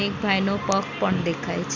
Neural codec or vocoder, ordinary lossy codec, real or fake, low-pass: none; none; real; 7.2 kHz